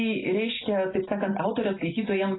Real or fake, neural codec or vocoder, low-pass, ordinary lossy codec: real; none; 7.2 kHz; AAC, 16 kbps